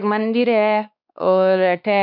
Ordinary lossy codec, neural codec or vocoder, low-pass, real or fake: none; codec, 16 kHz, 2 kbps, X-Codec, WavLM features, trained on Multilingual LibriSpeech; 5.4 kHz; fake